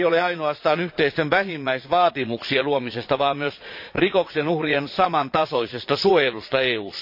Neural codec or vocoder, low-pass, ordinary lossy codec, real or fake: vocoder, 22.05 kHz, 80 mel bands, Vocos; 5.4 kHz; MP3, 32 kbps; fake